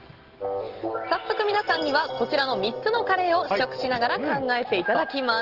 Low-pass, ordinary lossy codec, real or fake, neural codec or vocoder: 5.4 kHz; Opus, 16 kbps; real; none